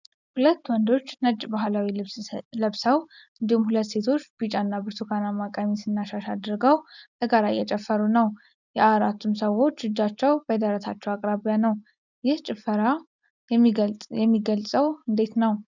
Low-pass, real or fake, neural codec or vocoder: 7.2 kHz; real; none